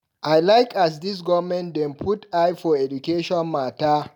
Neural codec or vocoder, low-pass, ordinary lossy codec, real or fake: none; 19.8 kHz; none; real